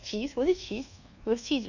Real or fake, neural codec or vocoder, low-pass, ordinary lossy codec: fake; codec, 24 kHz, 1.2 kbps, DualCodec; 7.2 kHz; none